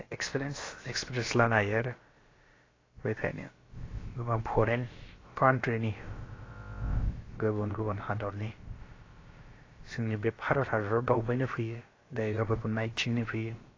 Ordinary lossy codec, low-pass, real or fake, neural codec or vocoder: AAC, 32 kbps; 7.2 kHz; fake; codec, 16 kHz, about 1 kbps, DyCAST, with the encoder's durations